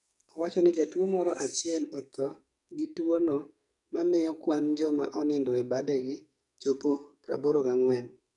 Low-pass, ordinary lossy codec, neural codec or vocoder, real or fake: 10.8 kHz; none; codec, 44.1 kHz, 2.6 kbps, SNAC; fake